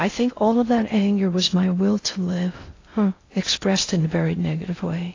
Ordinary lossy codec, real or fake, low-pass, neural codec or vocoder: AAC, 32 kbps; fake; 7.2 kHz; codec, 16 kHz in and 24 kHz out, 0.6 kbps, FocalCodec, streaming, 2048 codes